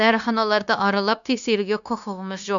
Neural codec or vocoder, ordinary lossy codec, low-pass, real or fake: codec, 16 kHz, 0.9 kbps, LongCat-Audio-Codec; none; 7.2 kHz; fake